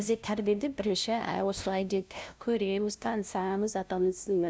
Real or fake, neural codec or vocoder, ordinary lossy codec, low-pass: fake; codec, 16 kHz, 0.5 kbps, FunCodec, trained on LibriTTS, 25 frames a second; none; none